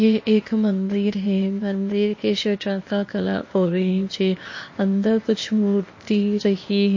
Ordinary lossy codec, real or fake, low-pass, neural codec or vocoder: MP3, 32 kbps; fake; 7.2 kHz; codec, 16 kHz, 0.8 kbps, ZipCodec